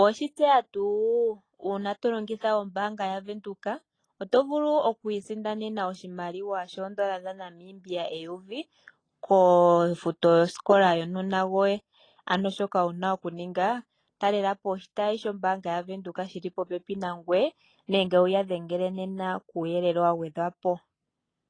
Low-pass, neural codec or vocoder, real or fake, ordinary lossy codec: 9.9 kHz; none; real; AAC, 32 kbps